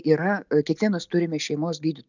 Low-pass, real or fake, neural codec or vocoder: 7.2 kHz; real; none